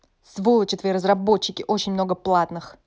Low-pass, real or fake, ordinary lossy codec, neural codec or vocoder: none; real; none; none